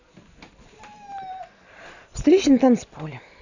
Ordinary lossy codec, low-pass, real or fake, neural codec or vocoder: none; 7.2 kHz; real; none